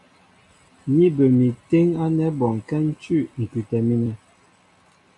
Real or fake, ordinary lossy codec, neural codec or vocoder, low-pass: real; AAC, 64 kbps; none; 10.8 kHz